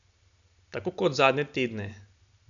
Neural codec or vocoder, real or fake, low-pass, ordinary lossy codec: none; real; 7.2 kHz; none